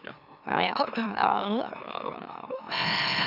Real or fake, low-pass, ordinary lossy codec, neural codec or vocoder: fake; 5.4 kHz; none; autoencoder, 44.1 kHz, a latent of 192 numbers a frame, MeloTTS